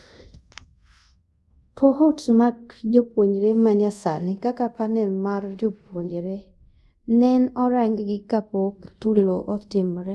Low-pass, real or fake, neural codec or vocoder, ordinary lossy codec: none; fake; codec, 24 kHz, 0.5 kbps, DualCodec; none